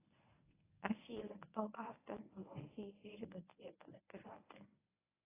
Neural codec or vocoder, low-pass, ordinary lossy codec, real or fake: codec, 24 kHz, 0.9 kbps, WavTokenizer, medium speech release version 1; 3.6 kHz; AAC, 16 kbps; fake